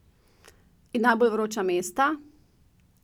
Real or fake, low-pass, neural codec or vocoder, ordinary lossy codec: real; 19.8 kHz; none; none